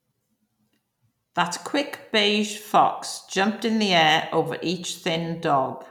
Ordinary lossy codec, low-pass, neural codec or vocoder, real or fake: none; 19.8 kHz; none; real